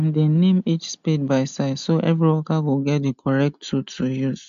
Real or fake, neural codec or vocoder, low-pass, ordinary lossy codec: real; none; 7.2 kHz; MP3, 64 kbps